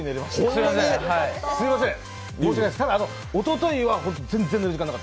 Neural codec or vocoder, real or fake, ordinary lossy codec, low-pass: none; real; none; none